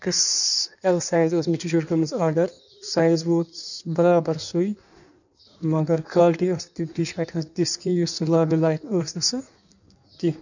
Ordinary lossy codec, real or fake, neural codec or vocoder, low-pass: none; fake; codec, 16 kHz in and 24 kHz out, 1.1 kbps, FireRedTTS-2 codec; 7.2 kHz